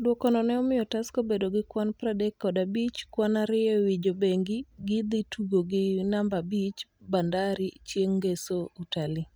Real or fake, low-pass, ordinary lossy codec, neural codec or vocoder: real; none; none; none